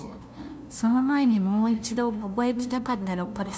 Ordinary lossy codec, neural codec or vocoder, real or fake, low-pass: none; codec, 16 kHz, 0.5 kbps, FunCodec, trained on LibriTTS, 25 frames a second; fake; none